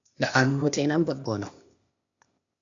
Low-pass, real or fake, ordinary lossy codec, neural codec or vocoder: 7.2 kHz; fake; AAC, 64 kbps; codec, 16 kHz, 0.8 kbps, ZipCodec